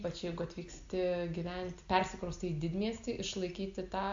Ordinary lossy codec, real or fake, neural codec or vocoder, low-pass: AAC, 64 kbps; real; none; 7.2 kHz